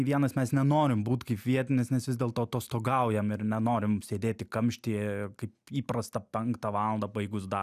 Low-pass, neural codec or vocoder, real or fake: 14.4 kHz; none; real